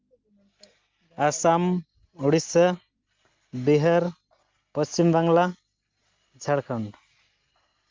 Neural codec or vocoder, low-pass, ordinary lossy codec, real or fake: none; 7.2 kHz; Opus, 32 kbps; real